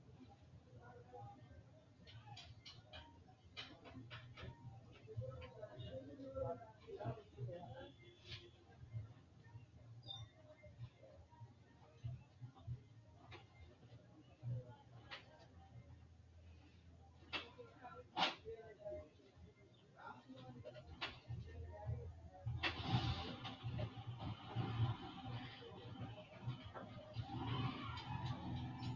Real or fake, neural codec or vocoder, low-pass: real; none; 7.2 kHz